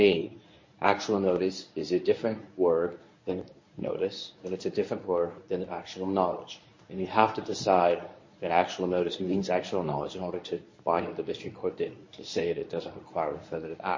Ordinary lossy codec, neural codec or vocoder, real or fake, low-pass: MP3, 32 kbps; codec, 24 kHz, 0.9 kbps, WavTokenizer, medium speech release version 1; fake; 7.2 kHz